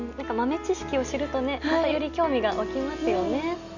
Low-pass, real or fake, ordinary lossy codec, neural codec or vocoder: 7.2 kHz; real; none; none